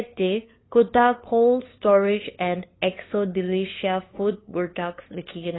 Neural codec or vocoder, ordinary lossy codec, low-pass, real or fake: codec, 16 kHz, 2 kbps, FunCodec, trained on LibriTTS, 25 frames a second; AAC, 16 kbps; 7.2 kHz; fake